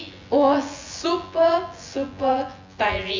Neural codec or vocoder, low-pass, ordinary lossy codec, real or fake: vocoder, 24 kHz, 100 mel bands, Vocos; 7.2 kHz; MP3, 64 kbps; fake